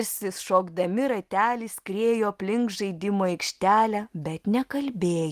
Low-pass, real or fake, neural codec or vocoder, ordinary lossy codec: 14.4 kHz; real; none; Opus, 24 kbps